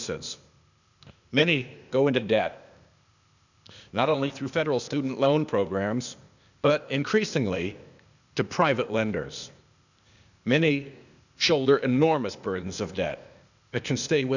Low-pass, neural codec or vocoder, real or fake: 7.2 kHz; codec, 16 kHz, 0.8 kbps, ZipCodec; fake